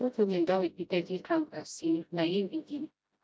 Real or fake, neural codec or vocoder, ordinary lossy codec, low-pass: fake; codec, 16 kHz, 0.5 kbps, FreqCodec, smaller model; none; none